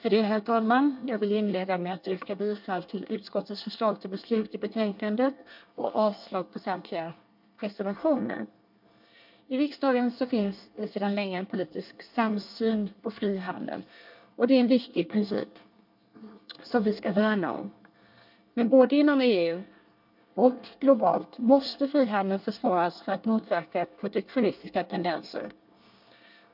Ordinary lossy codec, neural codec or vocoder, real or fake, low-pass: MP3, 48 kbps; codec, 24 kHz, 1 kbps, SNAC; fake; 5.4 kHz